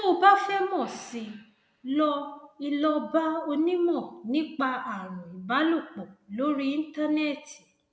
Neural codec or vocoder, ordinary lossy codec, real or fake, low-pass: none; none; real; none